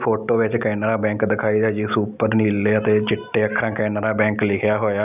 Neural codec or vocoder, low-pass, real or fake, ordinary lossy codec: none; 3.6 kHz; real; none